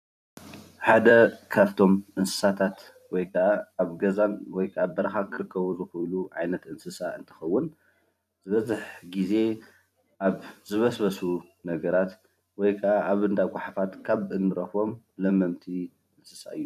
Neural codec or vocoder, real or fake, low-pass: vocoder, 44.1 kHz, 128 mel bands every 256 samples, BigVGAN v2; fake; 14.4 kHz